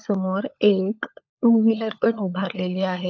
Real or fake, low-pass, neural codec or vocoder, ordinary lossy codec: fake; 7.2 kHz; codec, 16 kHz, 16 kbps, FunCodec, trained on LibriTTS, 50 frames a second; AAC, 48 kbps